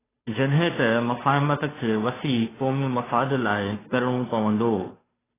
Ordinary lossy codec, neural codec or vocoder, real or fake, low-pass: AAC, 16 kbps; codec, 16 kHz, 2 kbps, FunCodec, trained on Chinese and English, 25 frames a second; fake; 3.6 kHz